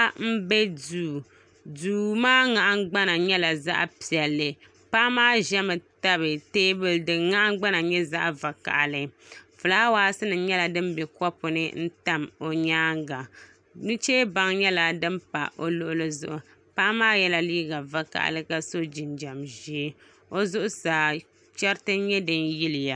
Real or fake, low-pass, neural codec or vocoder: real; 9.9 kHz; none